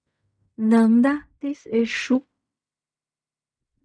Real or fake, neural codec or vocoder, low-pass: fake; codec, 16 kHz in and 24 kHz out, 0.4 kbps, LongCat-Audio-Codec, fine tuned four codebook decoder; 9.9 kHz